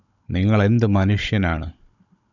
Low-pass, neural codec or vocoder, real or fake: 7.2 kHz; codec, 16 kHz, 16 kbps, FunCodec, trained on Chinese and English, 50 frames a second; fake